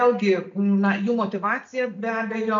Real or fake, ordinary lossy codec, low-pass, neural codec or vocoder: real; MP3, 64 kbps; 7.2 kHz; none